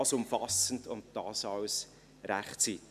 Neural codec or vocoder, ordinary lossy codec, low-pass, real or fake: none; none; 14.4 kHz; real